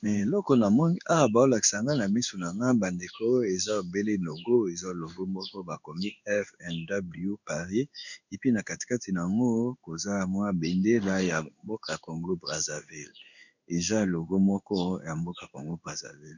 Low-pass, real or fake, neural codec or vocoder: 7.2 kHz; fake; codec, 16 kHz in and 24 kHz out, 1 kbps, XY-Tokenizer